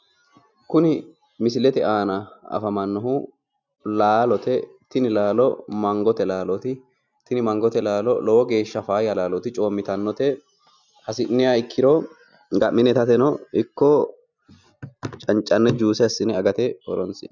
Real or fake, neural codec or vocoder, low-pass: real; none; 7.2 kHz